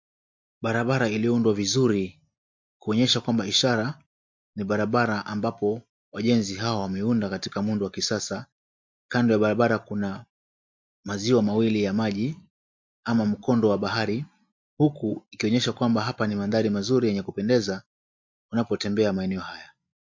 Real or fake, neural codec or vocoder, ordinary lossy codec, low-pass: real; none; MP3, 48 kbps; 7.2 kHz